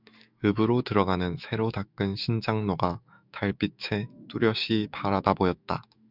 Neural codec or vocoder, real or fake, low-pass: autoencoder, 48 kHz, 128 numbers a frame, DAC-VAE, trained on Japanese speech; fake; 5.4 kHz